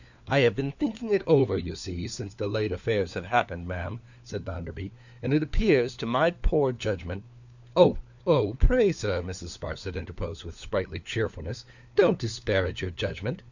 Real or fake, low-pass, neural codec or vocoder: fake; 7.2 kHz; codec, 16 kHz, 4 kbps, FunCodec, trained on LibriTTS, 50 frames a second